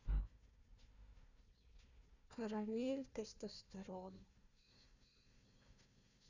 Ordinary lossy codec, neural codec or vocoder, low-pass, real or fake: none; codec, 16 kHz, 1 kbps, FunCodec, trained on Chinese and English, 50 frames a second; 7.2 kHz; fake